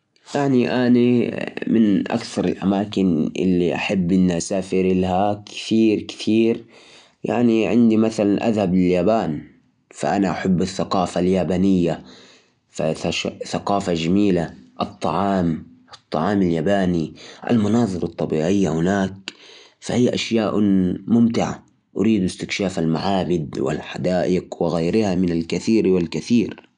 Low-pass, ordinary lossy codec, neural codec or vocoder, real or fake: 10.8 kHz; none; none; real